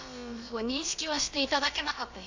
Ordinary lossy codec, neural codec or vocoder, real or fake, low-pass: AAC, 48 kbps; codec, 16 kHz, about 1 kbps, DyCAST, with the encoder's durations; fake; 7.2 kHz